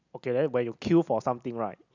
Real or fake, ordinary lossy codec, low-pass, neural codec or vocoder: real; none; 7.2 kHz; none